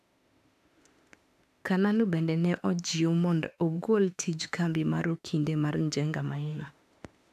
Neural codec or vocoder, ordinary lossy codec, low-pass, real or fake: autoencoder, 48 kHz, 32 numbers a frame, DAC-VAE, trained on Japanese speech; AAC, 96 kbps; 14.4 kHz; fake